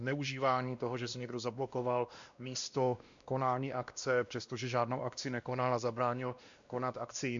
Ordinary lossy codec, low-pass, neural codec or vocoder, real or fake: MP3, 64 kbps; 7.2 kHz; codec, 16 kHz, 1 kbps, X-Codec, WavLM features, trained on Multilingual LibriSpeech; fake